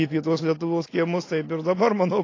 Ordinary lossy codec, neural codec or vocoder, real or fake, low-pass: AAC, 32 kbps; none; real; 7.2 kHz